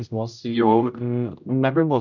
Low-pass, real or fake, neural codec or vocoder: 7.2 kHz; fake; codec, 16 kHz, 0.5 kbps, X-Codec, HuBERT features, trained on general audio